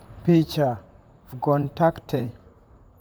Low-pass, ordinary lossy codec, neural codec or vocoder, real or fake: none; none; vocoder, 44.1 kHz, 128 mel bands, Pupu-Vocoder; fake